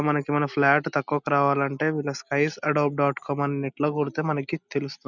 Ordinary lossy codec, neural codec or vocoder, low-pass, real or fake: none; none; 7.2 kHz; real